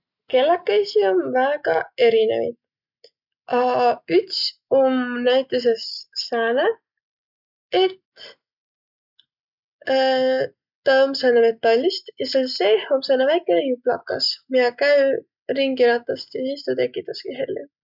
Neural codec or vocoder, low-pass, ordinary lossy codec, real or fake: none; 5.4 kHz; none; real